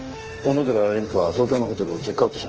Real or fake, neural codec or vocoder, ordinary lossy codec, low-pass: fake; autoencoder, 48 kHz, 32 numbers a frame, DAC-VAE, trained on Japanese speech; Opus, 16 kbps; 7.2 kHz